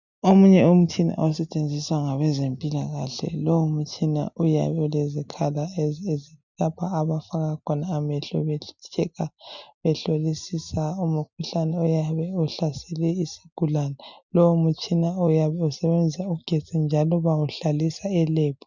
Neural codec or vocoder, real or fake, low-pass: none; real; 7.2 kHz